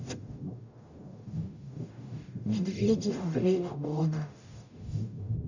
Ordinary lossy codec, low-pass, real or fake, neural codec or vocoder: none; 7.2 kHz; fake; codec, 44.1 kHz, 0.9 kbps, DAC